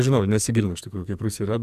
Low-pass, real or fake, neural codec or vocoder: 14.4 kHz; fake; codec, 44.1 kHz, 2.6 kbps, SNAC